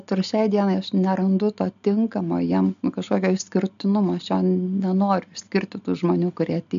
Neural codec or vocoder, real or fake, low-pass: none; real; 7.2 kHz